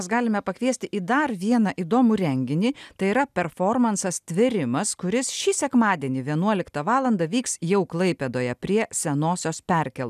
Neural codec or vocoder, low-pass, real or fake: none; 14.4 kHz; real